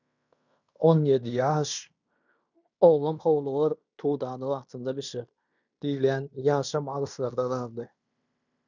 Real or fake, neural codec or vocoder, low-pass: fake; codec, 16 kHz in and 24 kHz out, 0.9 kbps, LongCat-Audio-Codec, fine tuned four codebook decoder; 7.2 kHz